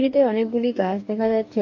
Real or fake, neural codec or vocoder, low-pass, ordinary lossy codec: fake; codec, 44.1 kHz, 2.6 kbps, DAC; 7.2 kHz; MP3, 48 kbps